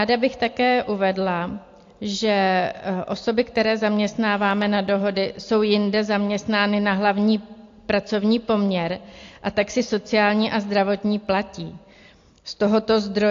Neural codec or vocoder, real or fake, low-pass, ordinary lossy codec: none; real; 7.2 kHz; AAC, 48 kbps